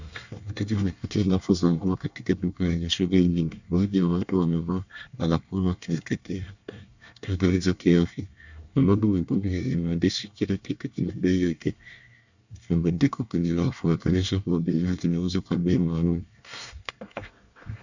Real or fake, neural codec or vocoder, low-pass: fake; codec, 24 kHz, 1 kbps, SNAC; 7.2 kHz